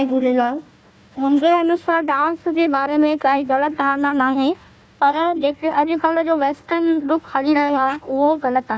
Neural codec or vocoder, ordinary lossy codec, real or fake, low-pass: codec, 16 kHz, 1 kbps, FunCodec, trained on Chinese and English, 50 frames a second; none; fake; none